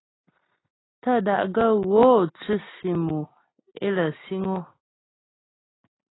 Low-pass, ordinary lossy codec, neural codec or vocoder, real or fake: 7.2 kHz; AAC, 16 kbps; none; real